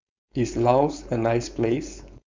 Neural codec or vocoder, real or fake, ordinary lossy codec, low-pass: codec, 16 kHz, 4.8 kbps, FACodec; fake; MP3, 64 kbps; 7.2 kHz